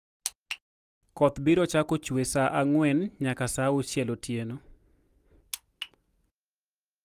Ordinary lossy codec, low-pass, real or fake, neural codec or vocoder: Opus, 32 kbps; 14.4 kHz; real; none